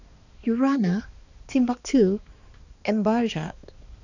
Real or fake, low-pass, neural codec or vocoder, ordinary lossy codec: fake; 7.2 kHz; codec, 16 kHz, 2 kbps, X-Codec, HuBERT features, trained on balanced general audio; none